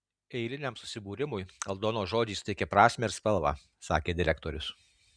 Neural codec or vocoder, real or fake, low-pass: none; real; 9.9 kHz